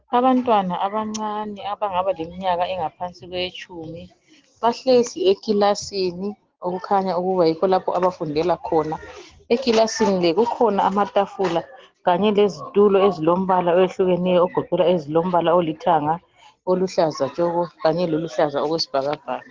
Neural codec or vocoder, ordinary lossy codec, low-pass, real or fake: none; Opus, 16 kbps; 7.2 kHz; real